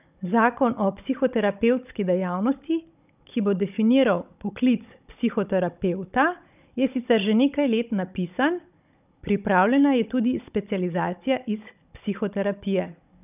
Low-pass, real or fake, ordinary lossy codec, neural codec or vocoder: 3.6 kHz; fake; none; codec, 16 kHz, 16 kbps, FunCodec, trained on Chinese and English, 50 frames a second